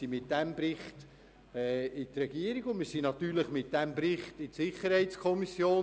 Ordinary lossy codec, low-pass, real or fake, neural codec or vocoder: none; none; real; none